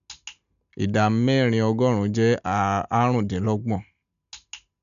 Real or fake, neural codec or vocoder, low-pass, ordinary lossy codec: real; none; 7.2 kHz; none